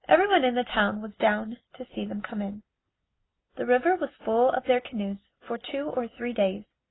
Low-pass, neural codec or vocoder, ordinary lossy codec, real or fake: 7.2 kHz; none; AAC, 16 kbps; real